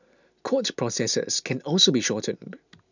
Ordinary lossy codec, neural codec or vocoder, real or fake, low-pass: none; none; real; 7.2 kHz